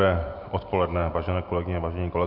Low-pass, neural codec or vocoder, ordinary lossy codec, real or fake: 5.4 kHz; none; MP3, 48 kbps; real